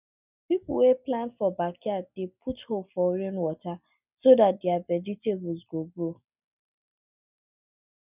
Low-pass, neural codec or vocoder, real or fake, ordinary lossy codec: 3.6 kHz; none; real; none